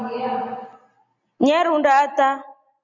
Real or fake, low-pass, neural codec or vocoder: real; 7.2 kHz; none